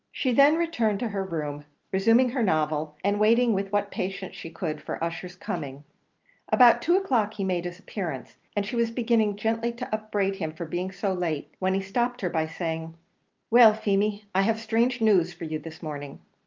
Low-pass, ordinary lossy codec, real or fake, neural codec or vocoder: 7.2 kHz; Opus, 24 kbps; real; none